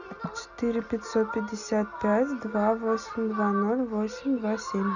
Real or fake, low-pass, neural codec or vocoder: real; 7.2 kHz; none